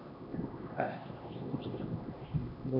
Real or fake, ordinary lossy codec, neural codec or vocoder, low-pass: fake; none; codec, 16 kHz, 1 kbps, X-Codec, HuBERT features, trained on LibriSpeech; 5.4 kHz